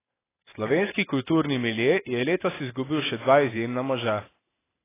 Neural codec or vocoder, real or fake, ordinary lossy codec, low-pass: vocoder, 44.1 kHz, 128 mel bands every 512 samples, BigVGAN v2; fake; AAC, 16 kbps; 3.6 kHz